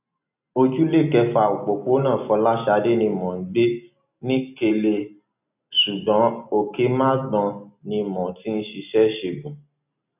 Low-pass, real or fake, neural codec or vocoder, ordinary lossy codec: 3.6 kHz; real; none; none